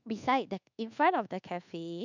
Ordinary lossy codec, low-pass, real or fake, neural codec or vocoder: none; 7.2 kHz; fake; codec, 24 kHz, 1.2 kbps, DualCodec